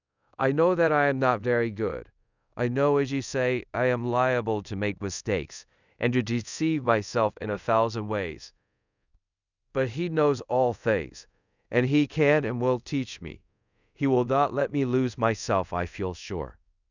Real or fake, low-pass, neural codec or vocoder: fake; 7.2 kHz; codec, 24 kHz, 0.5 kbps, DualCodec